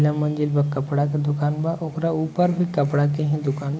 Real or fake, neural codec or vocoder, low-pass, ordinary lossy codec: real; none; none; none